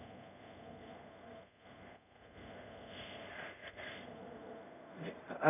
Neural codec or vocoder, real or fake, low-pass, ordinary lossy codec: codec, 24 kHz, 0.5 kbps, DualCodec; fake; 3.6 kHz; none